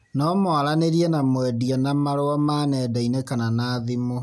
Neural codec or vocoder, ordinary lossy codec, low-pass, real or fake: none; none; none; real